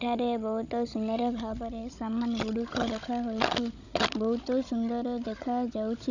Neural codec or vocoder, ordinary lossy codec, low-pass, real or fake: codec, 16 kHz, 16 kbps, FunCodec, trained on Chinese and English, 50 frames a second; none; 7.2 kHz; fake